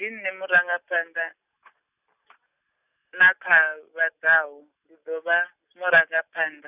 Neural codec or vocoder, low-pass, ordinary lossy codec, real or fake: none; 3.6 kHz; none; real